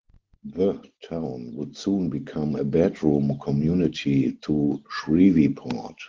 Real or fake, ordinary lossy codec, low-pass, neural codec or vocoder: real; Opus, 16 kbps; 7.2 kHz; none